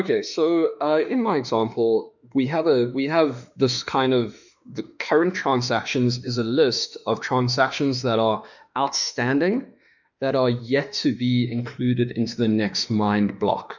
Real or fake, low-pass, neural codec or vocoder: fake; 7.2 kHz; autoencoder, 48 kHz, 32 numbers a frame, DAC-VAE, trained on Japanese speech